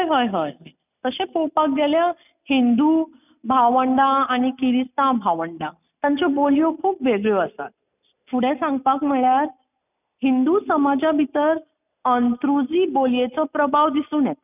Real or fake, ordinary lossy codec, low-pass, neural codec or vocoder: real; none; 3.6 kHz; none